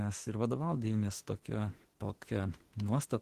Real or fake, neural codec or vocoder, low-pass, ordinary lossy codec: fake; autoencoder, 48 kHz, 32 numbers a frame, DAC-VAE, trained on Japanese speech; 14.4 kHz; Opus, 16 kbps